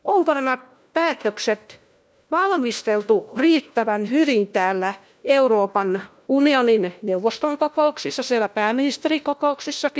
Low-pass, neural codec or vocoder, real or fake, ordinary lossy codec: none; codec, 16 kHz, 1 kbps, FunCodec, trained on LibriTTS, 50 frames a second; fake; none